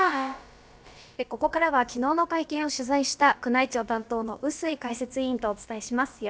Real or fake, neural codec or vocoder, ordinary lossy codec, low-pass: fake; codec, 16 kHz, about 1 kbps, DyCAST, with the encoder's durations; none; none